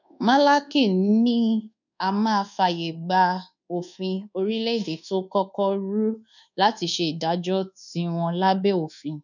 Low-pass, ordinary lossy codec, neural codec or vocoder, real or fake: 7.2 kHz; none; codec, 24 kHz, 1.2 kbps, DualCodec; fake